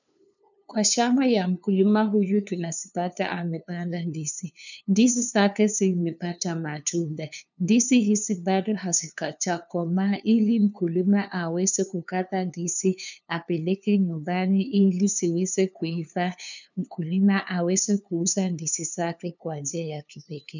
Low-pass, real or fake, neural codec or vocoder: 7.2 kHz; fake; codec, 16 kHz, 2 kbps, FunCodec, trained on LibriTTS, 25 frames a second